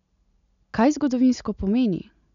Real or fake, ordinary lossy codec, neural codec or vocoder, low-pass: real; none; none; 7.2 kHz